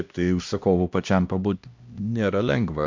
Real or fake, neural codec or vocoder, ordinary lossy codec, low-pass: fake; codec, 16 kHz, 1 kbps, X-Codec, HuBERT features, trained on LibriSpeech; MP3, 64 kbps; 7.2 kHz